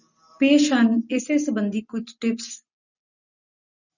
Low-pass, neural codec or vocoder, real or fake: 7.2 kHz; none; real